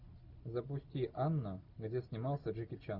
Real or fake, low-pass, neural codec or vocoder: real; 5.4 kHz; none